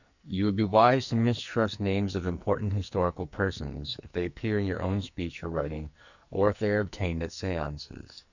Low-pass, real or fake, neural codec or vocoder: 7.2 kHz; fake; codec, 44.1 kHz, 2.6 kbps, SNAC